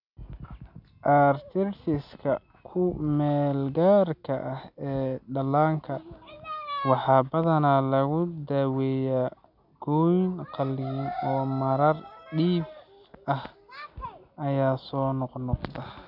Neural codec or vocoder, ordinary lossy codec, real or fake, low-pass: none; none; real; 5.4 kHz